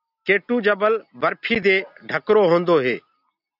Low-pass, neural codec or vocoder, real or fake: 5.4 kHz; none; real